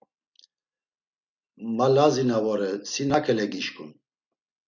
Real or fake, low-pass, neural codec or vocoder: real; 7.2 kHz; none